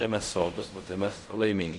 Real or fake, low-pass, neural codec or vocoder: fake; 10.8 kHz; codec, 16 kHz in and 24 kHz out, 0.4 kbps, LongCat-Audio-Codec, fine tuned four codebook decoder